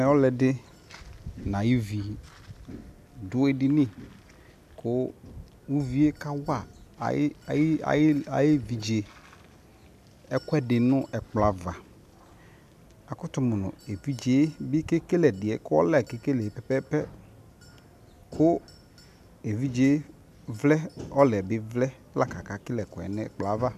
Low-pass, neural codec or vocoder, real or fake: 14.4 kHz; none; real